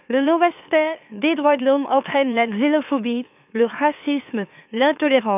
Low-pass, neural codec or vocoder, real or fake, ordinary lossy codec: 3.6 kHz; autoencoder, 44.1 kHz, a latent of 192 numbers a frame, MeloTTS; fake; none